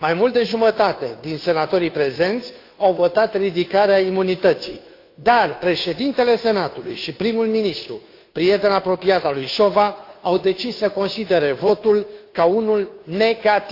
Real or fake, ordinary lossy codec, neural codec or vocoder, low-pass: fake; AAC, 32 kbps; codec, 16 kHz, 2 kbps, FunCodec, trained on Chinese and English, 25 frames a second; 5.4 kHz